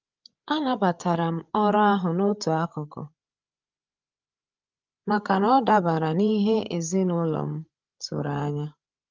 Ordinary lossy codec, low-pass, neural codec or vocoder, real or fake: Opus, 24 kbps; 7.2 kHz; codec, 16 kHz, 8 kbps, FreqCodec, larger model; fake